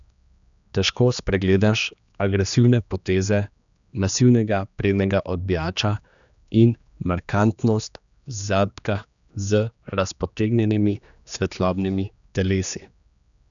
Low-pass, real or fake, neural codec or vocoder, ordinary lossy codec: 7.2 kHz; fake; codec, 16 kHz, 2 kbps, X-Codec, HuBERT features, trained on general audio; none